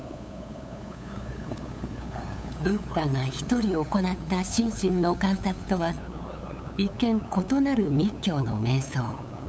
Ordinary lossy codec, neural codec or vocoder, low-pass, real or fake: none; codec, 16 kHz, 8 kbps, FunCodec, trained on LibriTTS, 25 frames a second; none; fake